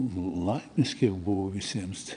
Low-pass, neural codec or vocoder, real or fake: 9.9 kHz; vocoder, 22.05 kHz, 80 mel bands, Vocos; fake